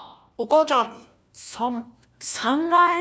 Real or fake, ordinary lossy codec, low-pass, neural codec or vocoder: fake; none; none; codec, 16 kHz, 1 kbps, FunCodec, trained on LibriTTS, 50 frames a second